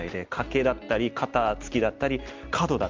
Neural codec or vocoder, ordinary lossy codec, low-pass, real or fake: none; Opus, 24 kbps; 7.2 kHz; real